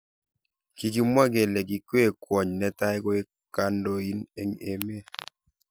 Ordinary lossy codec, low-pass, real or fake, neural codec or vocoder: none; none; real; none